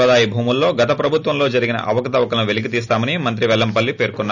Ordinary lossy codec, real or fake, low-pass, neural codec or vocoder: none; real; 7.2 kHz; none